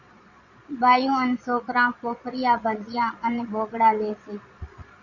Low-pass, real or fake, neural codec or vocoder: 7.2 kHz; fake; vocoder, 22.05 kHz, 80 mel bands, Vocos